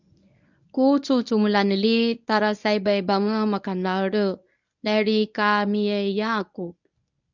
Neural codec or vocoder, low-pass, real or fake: codec, 24 kHz, 0.9 kbps, WavTokenizer, medium speech release version 1; 7.2 kHz; fake